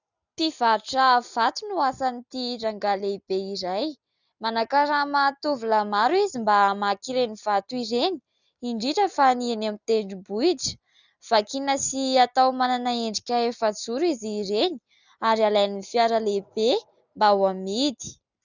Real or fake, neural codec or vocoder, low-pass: real; none; 7.2 kHz